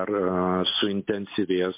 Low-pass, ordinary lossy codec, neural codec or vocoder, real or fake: 3.6 kHz; MP3, 24 kbps; none; real